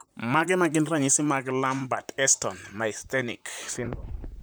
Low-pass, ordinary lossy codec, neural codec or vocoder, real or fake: none; none; vocoder, 44.1 kHz, 128 mel bands, Pupu-Vocoder; fake